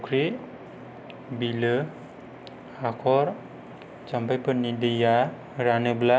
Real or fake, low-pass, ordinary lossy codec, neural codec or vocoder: real; none; none; none